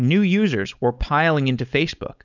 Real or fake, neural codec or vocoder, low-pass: fake; codec, 16 kHz, 4.8 kbps, FACodec; 7.2 kHz